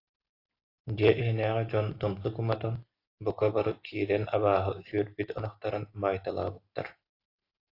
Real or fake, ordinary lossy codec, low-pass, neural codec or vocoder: real; AAC, 32 kbps; 5.4 kHz; none